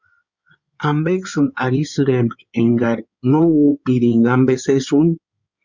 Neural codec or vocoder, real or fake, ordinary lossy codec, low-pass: codec, 16 kHz in and 24 kHz out, 2.2 kbps, FireRedTTS-2 codec; fake; Opus, 64 kbps; 7.2 kHz